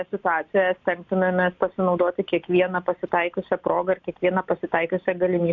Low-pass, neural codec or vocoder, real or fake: 7.2 kHz; none; real